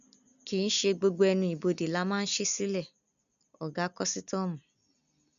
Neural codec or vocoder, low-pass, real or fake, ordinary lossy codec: none; 7.2 kHz; real; none